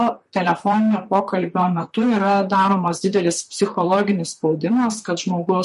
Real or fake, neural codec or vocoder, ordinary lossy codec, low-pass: fake; vocoder, 44.1 kHz, 128 mel bands, Pupu-Vocoder; MP3, 48 kbps; 14.4 kHz